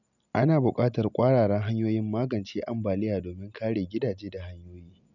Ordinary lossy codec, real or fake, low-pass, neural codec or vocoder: none; real; 7.2 kHz; none